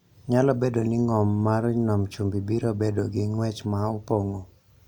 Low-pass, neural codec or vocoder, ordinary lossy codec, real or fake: 19.8 kHz; none; none; real